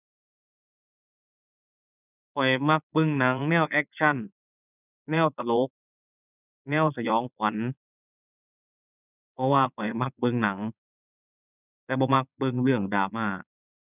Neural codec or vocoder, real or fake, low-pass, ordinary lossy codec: vocoder, 22.05 kHz, 80 mel bands, Vocos; fake; 3.6 kHz; none